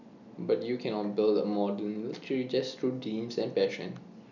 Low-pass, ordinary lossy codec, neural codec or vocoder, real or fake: 7.2 kHz; none; none; real